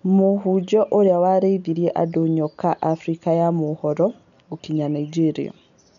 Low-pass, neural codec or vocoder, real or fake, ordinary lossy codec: 7.2 kHz; none; real; MP3, 96 kbps